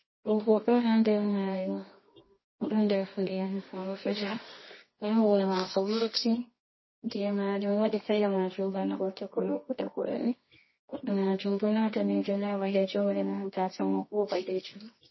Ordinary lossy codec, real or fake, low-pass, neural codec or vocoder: MP3, 24 kbps; fake; 7.2 kHz; codec, 24 kHz, 0.9 kbps, WavTokenizer, medium music audio release